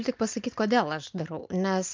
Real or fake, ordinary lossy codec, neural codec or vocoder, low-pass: real; Opus, 24 kbps; none; 7.2 kHz